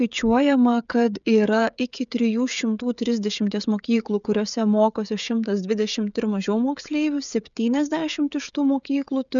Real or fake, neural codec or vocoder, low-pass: fake; codec, 16 kHz, 16 kbps, FreqCodec, smaller model; 7.2 kHz